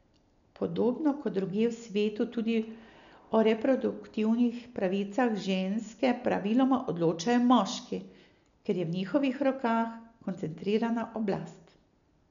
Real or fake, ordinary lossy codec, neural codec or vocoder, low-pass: real; none; none; 7.2 kHz